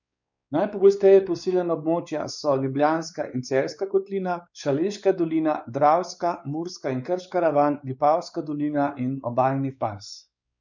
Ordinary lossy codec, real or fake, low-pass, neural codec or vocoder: none; fake; 7.2 kHz; codec, 16 kHz, 4 kbps, X-Codec, WavLM features, trained on Multilingual LibriSpeech